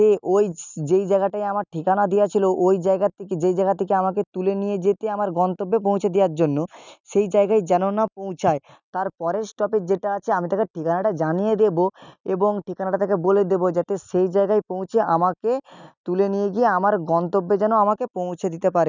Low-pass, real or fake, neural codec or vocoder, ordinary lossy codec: 7.2 kHz; real; none; none